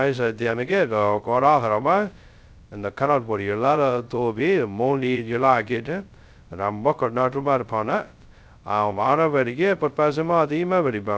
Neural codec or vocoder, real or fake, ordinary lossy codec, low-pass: codec, 16 kHz, 0.2 kbps, FocalCodec; fake; none; none